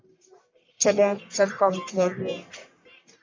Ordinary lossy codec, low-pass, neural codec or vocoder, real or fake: MP3, 64 kbps; 7.2 kHz; codec, 44.1 kHz, 1.7 kbps, Pupu-Codec; fake